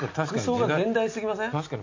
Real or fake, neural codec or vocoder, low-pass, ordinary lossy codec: real; none; 7.2 kHz; AAC, 48 kbps